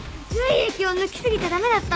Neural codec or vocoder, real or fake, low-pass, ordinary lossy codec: none; real; none; none